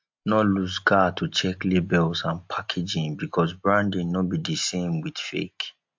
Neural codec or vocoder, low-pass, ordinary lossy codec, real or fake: none; 7.2 kHz; MP3, 48 kbps; real